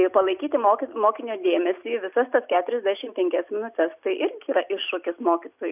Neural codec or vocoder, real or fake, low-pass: none; real; 3.6 kHz